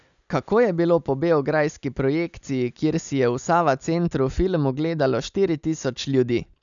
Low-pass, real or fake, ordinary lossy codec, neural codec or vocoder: 7.2 kHz; real; none; none